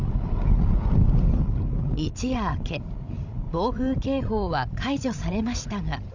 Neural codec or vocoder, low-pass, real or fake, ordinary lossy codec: codec, 16 kHz, 16 kbps, FreqCodec, larger model; 7.2 kHz; fake; none